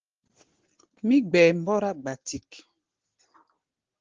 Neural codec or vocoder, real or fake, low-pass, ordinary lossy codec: none; real; 7.2 kHz; Opus, 16 kbps